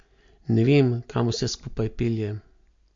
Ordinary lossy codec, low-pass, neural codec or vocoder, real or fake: MP3, 48 kbps; 7.2 kHz; none; real